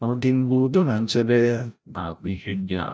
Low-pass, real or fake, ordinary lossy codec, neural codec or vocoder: none; fake; none; codec, 16 kHz, 0.5 kbps, FreqCodec, larger model